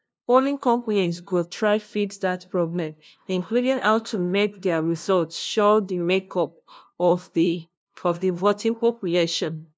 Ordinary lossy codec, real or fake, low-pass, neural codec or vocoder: none; fake; none; codec, 16 kHz, 0.5 kbps, FunCodec, trained on LibriTTS, 25 frames a second